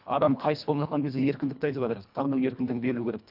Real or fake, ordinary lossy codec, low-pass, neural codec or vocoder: fake; none; 5.4 kHz; codec, 24 kHz, 1.5 kbps, HILCodec